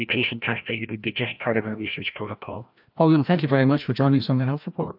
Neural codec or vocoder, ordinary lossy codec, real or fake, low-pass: codec, 16 kHz, 1 kbps, FreqCodec, larger model; AAC, 48 kbps; fake; 5.4 kHz